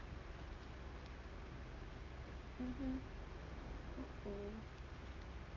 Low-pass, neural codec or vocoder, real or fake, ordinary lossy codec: 7.2 kHz; none; real; Opus, 24 kbps